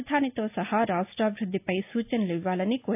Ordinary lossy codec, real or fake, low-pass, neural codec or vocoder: AAC, 32 kbps; real; 3.6 kHz; none